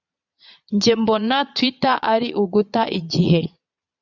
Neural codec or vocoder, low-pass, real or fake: vocoder, 22.05 kHz, 80 mel bands, Vocos; 7.2 kHz; fake